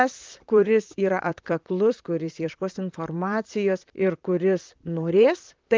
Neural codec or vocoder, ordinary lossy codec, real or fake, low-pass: vocoder, 44.1 kHz, 128 mel bands, Pupu-Vocoder; Opus, 32 kbps; fake; 7.2 kHz